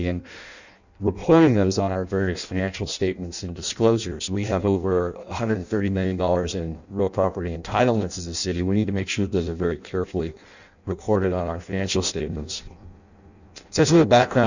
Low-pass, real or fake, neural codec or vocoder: 7.2 kHz; fake; codec, 16 kHz in and 24 kHz out, 0.6 kbps, FireRedTTS-2 codec